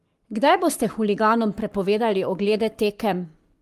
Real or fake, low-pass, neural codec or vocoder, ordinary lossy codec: fake; 14.4 kHz; codec, 44.1 kHz, 7.8 kbps, Pupu-Codec; Opus, 32 kbps